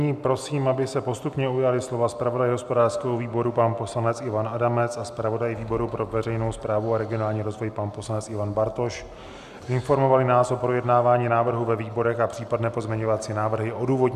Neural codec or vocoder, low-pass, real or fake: none; 14.4 kHz; real